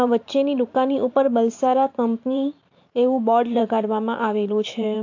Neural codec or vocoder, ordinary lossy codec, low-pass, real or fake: vocoder, 44.1 kHz, 128 mel bands every 512 samples, BigVGAN v2; AAC, 48 kbps; 7.2 kHz; fake